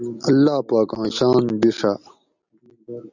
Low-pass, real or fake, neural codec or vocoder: 7.2 kHz; real; none